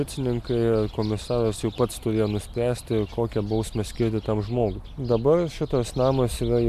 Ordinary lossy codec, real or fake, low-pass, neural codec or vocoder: AAC, 96 kbps; real; 14.4 kHz; none